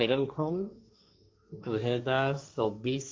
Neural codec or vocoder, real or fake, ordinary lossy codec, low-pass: codec, 16 kHz, 1.1 kbps, Voila-Tokenizer; fake; none; none